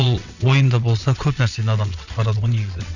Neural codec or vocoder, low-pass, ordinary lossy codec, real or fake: vocoder, 22.05 kHz, 80 mel bands, WaveNeXt; 7.2 kHz; none; fake